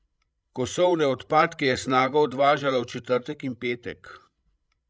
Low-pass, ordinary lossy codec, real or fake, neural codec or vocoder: none; none; fake; codec, 16 kHz, 16 kbps, FreqCodec, larger model